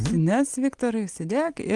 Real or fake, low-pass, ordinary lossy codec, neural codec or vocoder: real; 10.8 kHz; Opus, 24 kbps; none